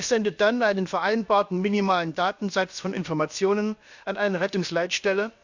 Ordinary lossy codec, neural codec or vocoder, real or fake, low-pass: Opus, 64 kbps; codec, 16 kHz, about 1 kbps, DyCAST, with the encoder's durations; fake; 7.2 kHz